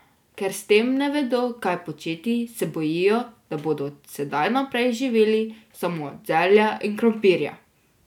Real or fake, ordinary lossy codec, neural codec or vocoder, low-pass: real; none; none; 19.8 kHz